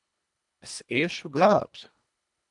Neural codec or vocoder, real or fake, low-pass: codec, 24 kHz, 1.5 kbps, HILCodec; fake; 10.8 kHz